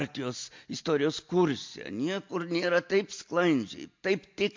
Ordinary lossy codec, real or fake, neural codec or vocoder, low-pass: MP3, 48 kbps; real; none; 7.2 kHz